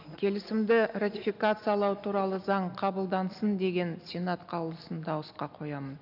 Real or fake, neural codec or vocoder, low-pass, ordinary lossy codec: real; none; 5.4 kHz; none